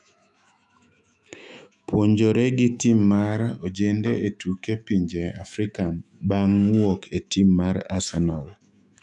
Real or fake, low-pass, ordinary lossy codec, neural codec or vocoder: fake; 10.8 kHz; none; autoencoder, 48 kHz, 128 numbers a frame, DAC-VAE, trained on Japanese speech